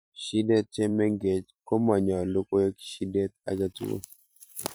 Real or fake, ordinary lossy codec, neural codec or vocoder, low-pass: fake; none; vocoder, 44.1 kHz, 128 mel bands every 512 samples, BigVGAN v2; none